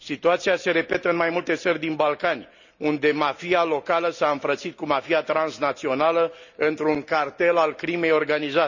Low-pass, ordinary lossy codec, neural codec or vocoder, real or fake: 7.2 kHz; none; none; real